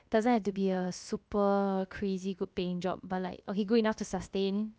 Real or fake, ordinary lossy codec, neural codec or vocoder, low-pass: fake; none; codec, 16 kHz, about 1 kbps, DyCAST, with the encoder's durations; none